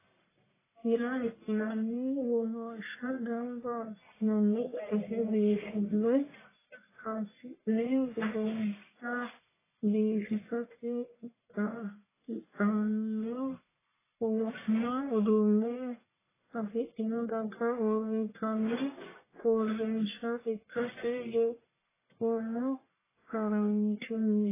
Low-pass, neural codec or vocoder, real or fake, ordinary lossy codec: 3.6 kHz; codec, 44.1 kHz, 1.7 kbps, Pupu-Codec; fake; AAC, 16 kbps